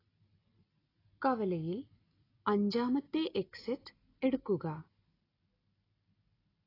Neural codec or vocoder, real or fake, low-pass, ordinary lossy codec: none; real; 5.4 kHz; AAC, 24 kbps